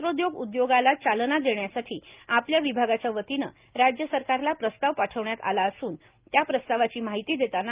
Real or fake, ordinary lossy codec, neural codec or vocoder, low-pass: real; Opus, 32 kbps; none; 3.6 kHz